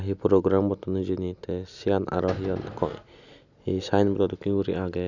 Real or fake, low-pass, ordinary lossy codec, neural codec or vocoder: real; 7.2 kHz; none; none